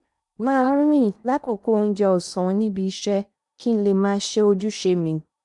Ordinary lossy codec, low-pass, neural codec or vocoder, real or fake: none; 10.8 kHz; codec, 16 kHz in and 24 kHz out, 0.8 kbps, FocalCodec, streaming, 65536 codes; fake